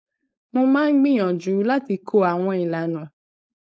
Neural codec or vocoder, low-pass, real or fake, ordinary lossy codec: codec, 16 kHz, 4.8 kbps, FACodec; none; fake; none